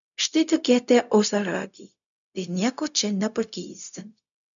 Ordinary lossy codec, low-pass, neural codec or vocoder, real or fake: MP3, 96 kbps; 7.2 kHz; codec, 16 kHz, 0.4 kbps, LongCat-Audio-Codec; fake